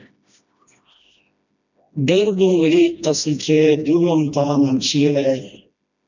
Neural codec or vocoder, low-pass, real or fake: codec, 16 kHz, 1 kbps, FreqCodec, smaller model; 7.2 kHz; fake